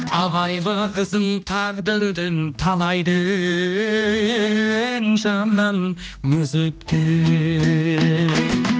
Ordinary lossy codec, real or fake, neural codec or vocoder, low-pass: none; fake; codec, 16 kHz, 1 kbps, X-Codec, HuBERT features, trained on general audio; none